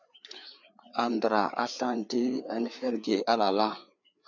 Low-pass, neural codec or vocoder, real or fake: 7.2 kHz; codec, 16 kHz, 4 kbps, FreqCodec, larger model; fake